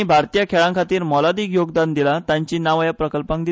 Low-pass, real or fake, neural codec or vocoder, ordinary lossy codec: none; real; none; none